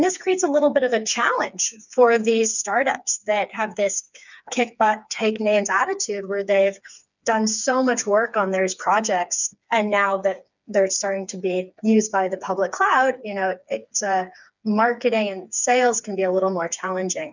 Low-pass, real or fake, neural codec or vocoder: 7.2 kHz; fake; codec, 16 kHz, 4 kbps, FreqCodec, smaller model